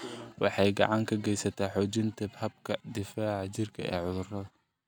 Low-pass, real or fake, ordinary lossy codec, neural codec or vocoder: none; real; none; none